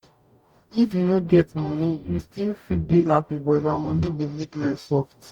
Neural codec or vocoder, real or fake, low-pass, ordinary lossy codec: codec, 44.1 kHz, 0.9 kbps, DAC; fake; 19.8 kHz; Opus, 64 kbps